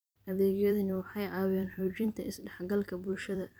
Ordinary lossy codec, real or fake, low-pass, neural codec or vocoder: none; fake; none; vocoder, 44.1 kHz, 128 mel bands every 256 samples, BigVGAN v2